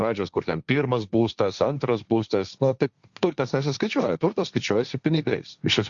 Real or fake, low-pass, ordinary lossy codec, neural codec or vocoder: fake; 7.2 kHz; Opus, 64 kbps; codec, 16 kHz, 1.1 kbps, Voila-Tokenizer